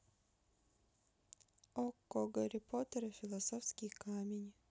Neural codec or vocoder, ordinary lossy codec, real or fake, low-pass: none; none; real; none